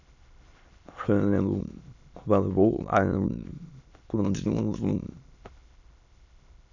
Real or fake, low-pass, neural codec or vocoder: fake; 7.2 kHz; autoencoder, 22.05 kHz, a latent of 192 numbers a frame, VITS, trained on many speakers